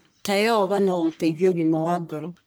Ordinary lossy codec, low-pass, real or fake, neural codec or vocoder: none; none; fake; codec, 44.1 kHz, 1.7 kbps, Pupu-Codec